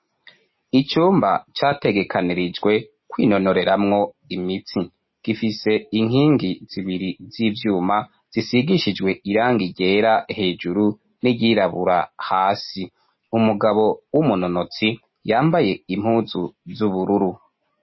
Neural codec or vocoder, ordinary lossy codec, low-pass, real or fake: none; MP3, 24 kbps; 7.2 kHz; real